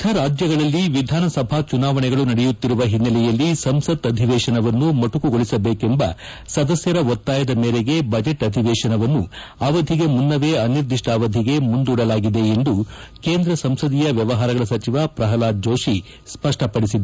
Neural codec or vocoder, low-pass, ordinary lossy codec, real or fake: none; none; none; real